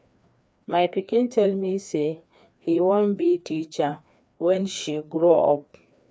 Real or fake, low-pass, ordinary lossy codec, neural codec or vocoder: fake; none; none; codec, 16 kHz, 2 kbps, FreqCodec, larger model